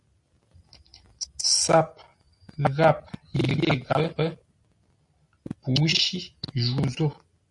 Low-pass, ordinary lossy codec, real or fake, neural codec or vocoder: 10.8 kHz; MP3, 48 kbps; real; none